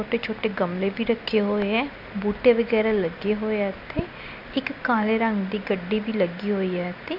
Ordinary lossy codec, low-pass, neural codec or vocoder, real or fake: none; 5.4 kHz; none; real